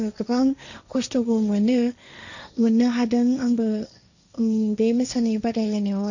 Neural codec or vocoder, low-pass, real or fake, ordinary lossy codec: codec, 16 kHz, 1.1 kbps, Voila-Tokenizer; 7.2 kHz; fake; none